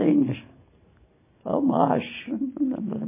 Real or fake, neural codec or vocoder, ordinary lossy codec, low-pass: real; none; MP3, 16 kbps; 3.6 kHz